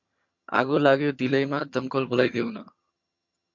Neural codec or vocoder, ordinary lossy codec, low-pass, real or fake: vocoder, 22.05 kHz, 80 mel bands, HiFi-GAN; MP3, 48 kbps; 7.2 kHz; fake